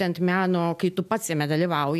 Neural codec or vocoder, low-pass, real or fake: none; 14.4 kHz; real